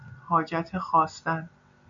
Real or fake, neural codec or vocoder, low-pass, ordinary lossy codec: real; none; 7.2 kHz; MP3, 64 kbps